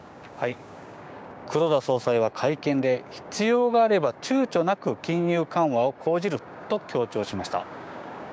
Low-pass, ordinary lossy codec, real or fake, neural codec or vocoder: none; none; fake; codec, 16 kHz, 6 kbps, DAC